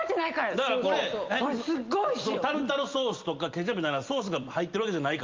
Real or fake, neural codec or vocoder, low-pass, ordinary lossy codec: real; none; 7.2 kHz; Opus, 24 kbps